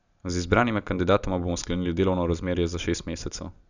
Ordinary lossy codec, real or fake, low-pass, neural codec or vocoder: none; real; 7.2 kHz; none